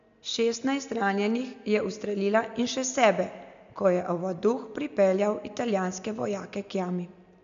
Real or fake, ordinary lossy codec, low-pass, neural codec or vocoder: real; MP3, 64 kbps; 7.2 kHz; none